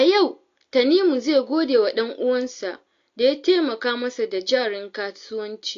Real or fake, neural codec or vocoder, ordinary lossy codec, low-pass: real; none; AAC, 48 kbps; 7.2 kHz